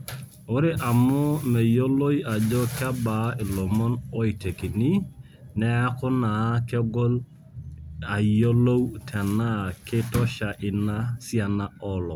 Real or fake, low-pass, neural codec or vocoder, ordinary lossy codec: real; none; none; none